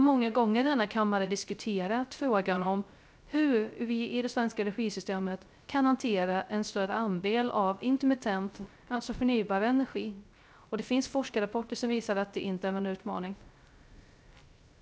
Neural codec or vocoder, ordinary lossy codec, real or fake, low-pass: codec, 16 kHz, 0.3 kbps, FocalCodec; none; fake; none